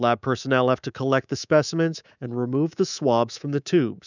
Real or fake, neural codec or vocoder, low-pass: fake; codec, 24 kHz, 3.1 kbps, DualCodec; 7.2 kHz